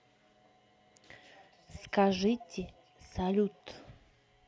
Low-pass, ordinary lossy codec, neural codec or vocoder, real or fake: none; none; none; real